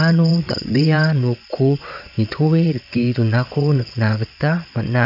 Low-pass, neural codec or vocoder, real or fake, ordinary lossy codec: 5.4 kHz; vocoder, 22.05 kHz, 80 mel bands, WaveNeXt; fake; none